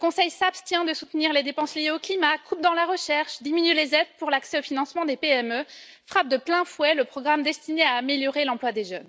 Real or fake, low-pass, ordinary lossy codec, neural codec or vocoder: real; none; none; none